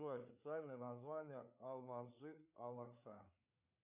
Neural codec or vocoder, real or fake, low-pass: codec, 16 kHz, 4 kbps, FreqCodec, larger model; fake; 3.6 kHz